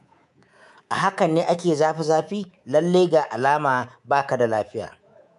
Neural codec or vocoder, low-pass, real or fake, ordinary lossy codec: codec, 24 kHz, 3.1 kbps, DualCodec; 10.8 kHz; fake; none